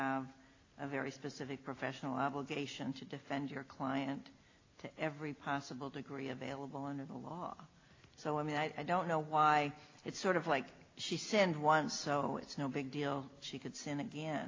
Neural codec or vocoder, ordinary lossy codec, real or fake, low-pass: none; AAC, 32 kbps; real; 7.2 kHz